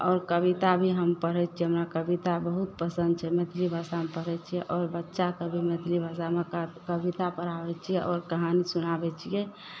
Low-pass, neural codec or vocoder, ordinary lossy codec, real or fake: none; none; none; real